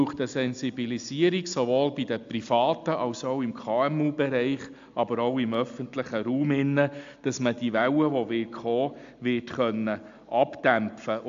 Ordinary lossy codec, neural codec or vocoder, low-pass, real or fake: AAC, 64 kbps; none; 7.2 kHz; real